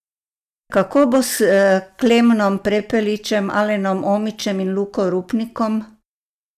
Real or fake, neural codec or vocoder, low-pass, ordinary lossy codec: real; none; 14.4 kHz; none